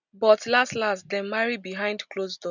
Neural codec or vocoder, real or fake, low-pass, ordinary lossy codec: none; real; 7.2 kHz; none